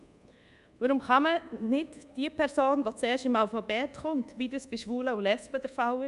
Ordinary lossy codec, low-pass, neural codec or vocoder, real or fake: none; 10.8 kHz; codec, 24 kHz, 1.2 kbps, DualCodec; fake